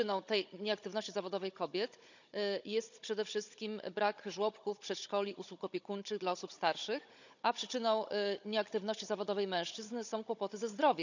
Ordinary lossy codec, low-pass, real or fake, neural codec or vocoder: none; 7.2 kHz; fake; codec, 16 kHz, 16 kbps, FunCodec, trained on Chinese and English, 50 frames a second